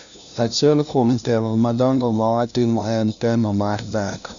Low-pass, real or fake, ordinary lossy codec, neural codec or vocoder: 7.2 kHz; fake; none; codec, 16 kHz, 0.5 kbps, FunCodec, trained on LibriTTS, 25 frames a second